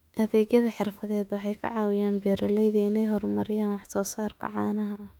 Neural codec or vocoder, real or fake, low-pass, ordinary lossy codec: autoencoder, 48 kHz, 32 numbers a frame, DAC-VAE, trained on Japanese speech; fake; 19.8 kHz; none